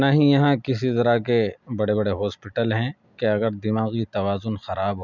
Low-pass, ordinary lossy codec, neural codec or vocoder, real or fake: 7.2 kHz; none; none; real